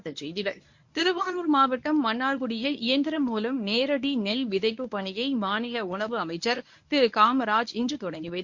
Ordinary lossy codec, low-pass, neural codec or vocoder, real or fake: MP3, 48 kbps; 7.2 kHz; codec, 24 kHz, 0.9 kbps, WavTokenizer, medium speech release version 1; fake